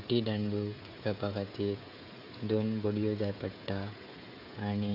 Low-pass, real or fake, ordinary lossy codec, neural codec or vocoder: 5.4 kHz; fake; none; codec, 16 kHz, 16 kbps, FreqCodec, smaller model